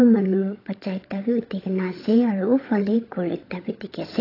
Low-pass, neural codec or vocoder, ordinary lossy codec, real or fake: 5.4 kHz; codec, 16 kHz, 16 kbps, FunCodec, trained on LibriTTS, 50 frames a second; AAC, 24 kbps; fake